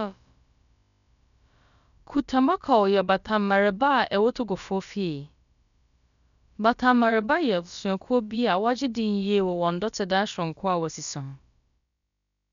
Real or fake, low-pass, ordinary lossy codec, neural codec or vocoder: fake; 7.2 kHz; none; codec, 16 kHz, about 1 kbps, DyCAST, with the encoder's durations